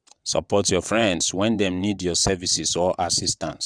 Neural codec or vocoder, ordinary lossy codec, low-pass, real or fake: vocoder, 22.05 kHz, 80 mel bands, WaveNeXt; none; 9.9 kHz; fake